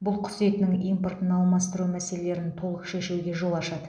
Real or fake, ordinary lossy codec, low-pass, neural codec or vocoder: real; none; 9.9 kHz; none